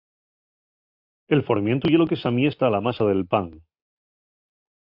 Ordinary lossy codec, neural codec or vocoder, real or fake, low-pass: AAC, 48 kbps; none; real; 5.4 kHz